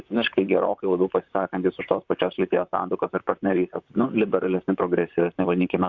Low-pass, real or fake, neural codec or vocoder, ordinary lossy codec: 7.2 kHz; fake; vocoder, 44.1 kHz, 128 mel bands, Pupu-Vocoder; Opus, 64 kbps